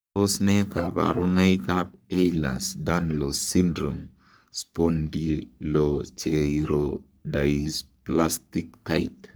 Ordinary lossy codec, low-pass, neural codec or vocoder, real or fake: none; none; codec, 44.1 kHz, 3.4 kbps, Pupu-Codec; fake